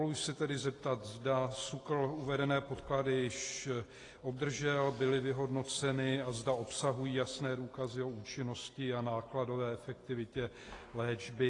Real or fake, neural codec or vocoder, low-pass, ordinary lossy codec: real; none; 10.8 kHz; AAC, 32 kbps